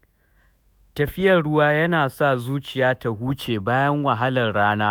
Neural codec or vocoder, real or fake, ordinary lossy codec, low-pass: autoencoder, 48 kHz, 128 numbers a frame, DAC-VAE, trained on Japanese speech; fake; none; none